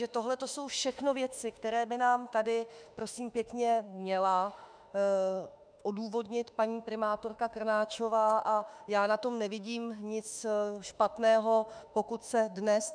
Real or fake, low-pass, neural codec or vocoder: fake; 9.9 kHz; autoencoder, 48 kHz, 32 numbers a frame, DAC-VAE, trained on Japanese speech